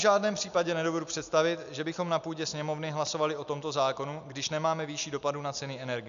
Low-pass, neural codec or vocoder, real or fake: 7.2 kHz; none; real